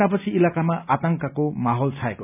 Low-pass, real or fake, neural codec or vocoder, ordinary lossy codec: 3.6 kHz; real; none; none